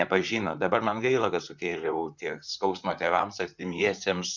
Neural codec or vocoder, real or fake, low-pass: vocoder, 44.1 kHz, 128 mel bands, Pupu-Vocoder; fake; 7.2 kHz